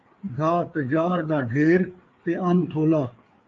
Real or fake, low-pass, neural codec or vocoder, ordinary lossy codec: fake; 7.2 kHz; codec, 16 kHz, 4 kbps, FreqCodec, larger model; Opus, 32 kbps